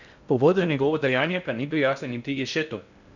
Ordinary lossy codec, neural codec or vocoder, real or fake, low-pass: none; codec, 16 kHz in and 24 kHz out, 0.6 kbps, FocalCodec, streaming, 2048 codes; fake; 7.2 kHz